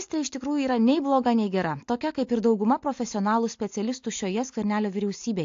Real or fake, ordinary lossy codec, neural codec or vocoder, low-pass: real; AAC, 48 kbps; none; 7.2 kHz